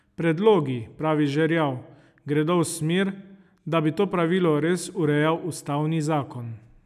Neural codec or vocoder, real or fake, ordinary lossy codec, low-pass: none; real; none; 14.4 kHz